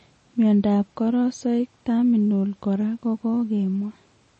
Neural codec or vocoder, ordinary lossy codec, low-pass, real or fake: none; MP3, 32 kbps; 10.8 kHz; real